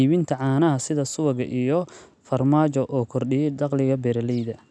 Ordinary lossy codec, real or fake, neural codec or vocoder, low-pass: none; real; none; none